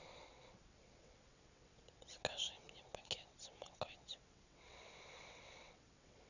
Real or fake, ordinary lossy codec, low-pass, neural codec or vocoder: fake; none; 7.2 kHz; vocoder, 22.05 kHz, 80 mel bands, WaveNeXt